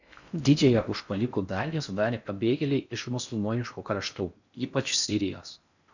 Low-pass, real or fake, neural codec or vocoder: 7.2 kHz; fake; codec, 16 kHz in and 24 kHz out, 0.6 kbps, FocalCodec, streaming, 4096 codes